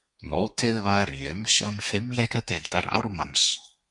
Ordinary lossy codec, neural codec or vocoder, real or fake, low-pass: Opus, 64 kbps; codec, 32 kHz, 1.9 kbps, SNAC; fake; 10.8 kHz